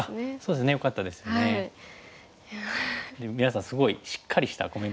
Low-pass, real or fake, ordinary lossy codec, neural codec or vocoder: none; real; none; none